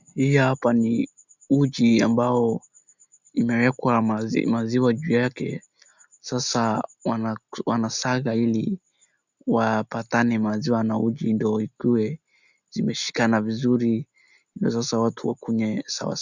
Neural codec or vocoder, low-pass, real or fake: none; 7.2 kHz; real